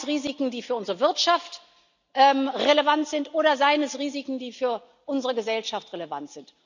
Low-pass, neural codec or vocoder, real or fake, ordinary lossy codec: 7.2 kHz; none; real; none